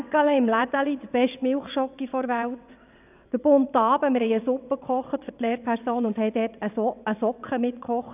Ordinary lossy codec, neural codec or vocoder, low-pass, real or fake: none; vocoder, 22.05 kHz, 80 mel bands, WaveNeXt; 3.6 kHz; fake